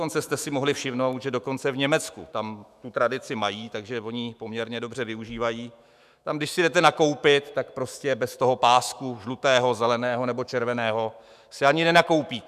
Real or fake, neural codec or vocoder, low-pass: fake; autoencoder, 48 kHz, 128 numbers a frame, DAC-VAE, trained on Japanese speech; 14.4 kHz